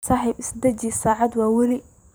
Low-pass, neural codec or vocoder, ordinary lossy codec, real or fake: none; vocoder, 44.1 kHz, 128 mel bands every 256 samples, BigVGAN v2; none; fake